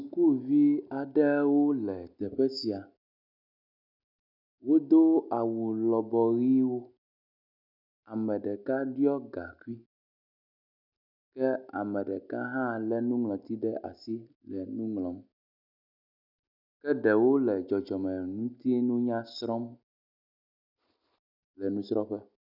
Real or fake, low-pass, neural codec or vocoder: real; 5.4 kHz; none